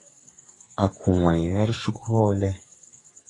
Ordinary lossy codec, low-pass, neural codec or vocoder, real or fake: AAC, 32 kbps; 10.8 kHz; codec, 44.1 kHz, 2.6 kbps, SNAC; fake